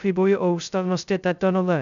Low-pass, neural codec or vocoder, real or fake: 7.2 kHz; codec, 16 kHz, 0.2 kbps, FocalCodec; fake